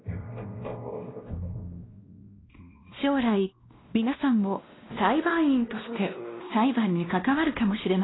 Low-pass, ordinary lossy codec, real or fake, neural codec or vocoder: 7.2 kHz; AAC, 16 kbps; fake; codec, 16 kHz, 1 kbps, X-Codec, WavLM features, trained on Multilingual LibriSpeech